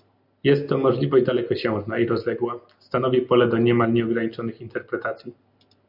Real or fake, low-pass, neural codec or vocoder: real; 5.4 kHz; none